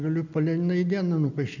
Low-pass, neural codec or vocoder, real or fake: 7.2 kHz; none; real